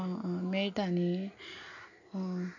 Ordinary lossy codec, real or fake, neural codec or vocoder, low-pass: none; real; none; 7.2 kHz